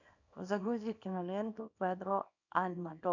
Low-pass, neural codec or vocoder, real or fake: 7.2 kHz; codec, 24 kHz, 0.9 kbps, WavTokenizer, small release; fake